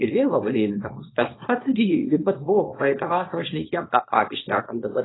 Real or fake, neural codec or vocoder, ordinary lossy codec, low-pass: fake; codec, 24 kHz, 0.9 kbps, WavTokenizer, small release; AAC, 16 kbps; 7.2 kHz